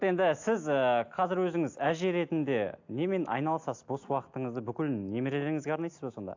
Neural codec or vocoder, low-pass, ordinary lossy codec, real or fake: none; 7.2 kHz; AAC, 48 kbps; real